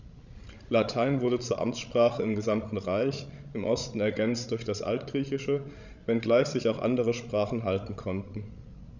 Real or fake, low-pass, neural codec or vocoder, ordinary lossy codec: fake; 7.2 kHz; codec, 16 kHz, 16 kbps, FreqCodec, larger model; none